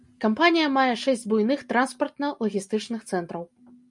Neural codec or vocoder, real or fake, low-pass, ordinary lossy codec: none; real; 10.8 kHz; MP3, 96 kbps